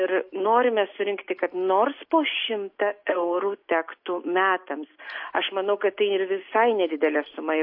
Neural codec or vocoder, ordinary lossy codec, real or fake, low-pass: none; MP3, 32 kbps; real; 5.4 kHz